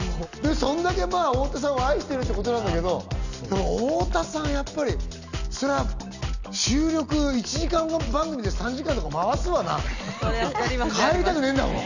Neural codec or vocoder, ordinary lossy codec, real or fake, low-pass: none; none; real; 7.2 kHz